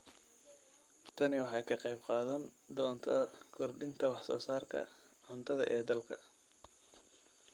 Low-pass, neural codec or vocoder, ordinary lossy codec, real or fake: 19.8 kHz; codec, 44.1 kHz, 7.8 kbps, Pupu-Codec; Opus, 32 kbps; fake